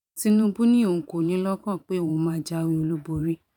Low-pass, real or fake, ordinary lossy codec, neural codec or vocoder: 19.8 kHz; fake; none; vocoder, 44.1 kHz, 128 mel bands every 512 samples, BigVGAN v2